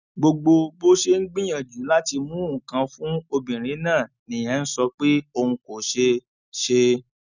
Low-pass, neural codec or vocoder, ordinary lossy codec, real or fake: 7.2 kHz; none; none; real